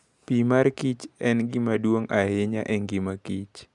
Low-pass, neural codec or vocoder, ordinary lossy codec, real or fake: 10.8 kHz; none; none; real